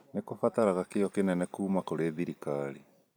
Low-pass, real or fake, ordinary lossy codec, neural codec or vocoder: none; fake; none; vocoder, 44.1 kHz, 128 mel bands every 256 samples, BigVGAN v2